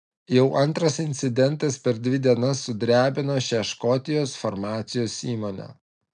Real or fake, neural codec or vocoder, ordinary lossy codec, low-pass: real; none; MP3, 96 kbps; 9.9 kHz